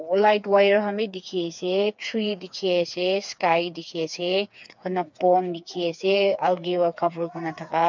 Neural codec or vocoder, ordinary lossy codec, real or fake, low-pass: codec, 16 kHz, 4 kbps, FreqCodec, smaller model; MP3, 64 kbps; fake; 7.2 kHz